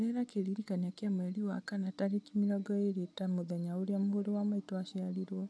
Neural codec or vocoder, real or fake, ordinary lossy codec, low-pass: none; real; none; none